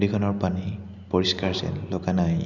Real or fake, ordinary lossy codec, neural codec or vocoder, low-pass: real; Opus, 64 kbps; none; 7.2 kHz